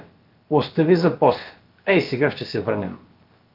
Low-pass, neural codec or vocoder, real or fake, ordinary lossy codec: 5.4 kHz; codec, 16 kHz, about 1 kbps, DyCAST, with the encoder's durations; fake; Opus, 32 kbps